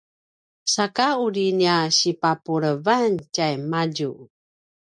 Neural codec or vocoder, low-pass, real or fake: none; 9.9 kHz; real